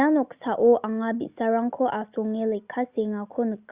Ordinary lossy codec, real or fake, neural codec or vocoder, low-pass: Opus, 64 kbps; real; none; 3.6 kHz